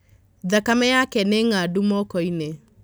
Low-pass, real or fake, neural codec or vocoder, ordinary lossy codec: none; real; none; none